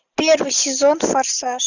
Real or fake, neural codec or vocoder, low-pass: real; none; 7.2 kHz